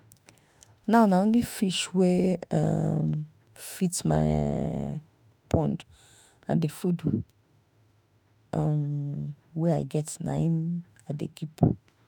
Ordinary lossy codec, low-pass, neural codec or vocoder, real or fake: none; none; autoencoder, 48 kHz, 32 numbers a frame, DAC-VAE, trained on Japanese speech; fake